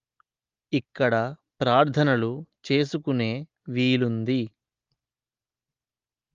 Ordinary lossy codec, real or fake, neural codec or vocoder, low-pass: Opus, 32 kbps; real; none; 7.2 kHz